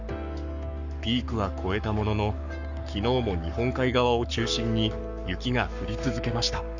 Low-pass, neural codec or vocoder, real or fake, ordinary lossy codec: 7.2 kHz; codec, 44.1 kHz, 7.8 kbps, Pupu-Codec; fake; none